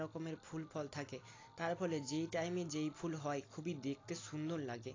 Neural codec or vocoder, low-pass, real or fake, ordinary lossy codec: none; 7.2 kHz; real; AAC, 32 kbps